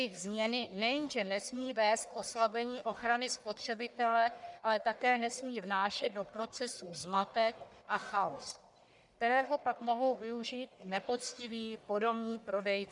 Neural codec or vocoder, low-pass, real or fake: codec, 44.1 kHz, 1.7 kbps, Pupu-Codec; 10.8 kHz; fake